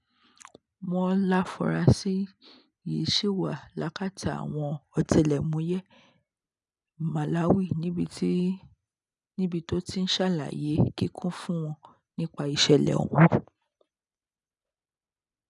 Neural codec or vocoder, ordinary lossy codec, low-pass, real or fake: none; none; 10.8 kHz; real